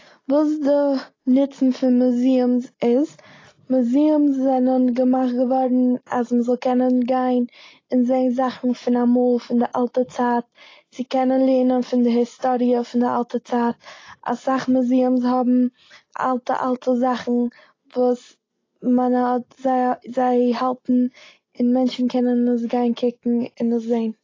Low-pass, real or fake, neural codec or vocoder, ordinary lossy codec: 7.2 kHz; real; none; AAC, 32 kbps